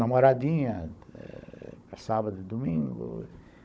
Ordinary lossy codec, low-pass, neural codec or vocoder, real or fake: none; none; codec, 16 kHz, 16 kbps, FunCodec, trained on Chinese and English, 50 frames a second; fake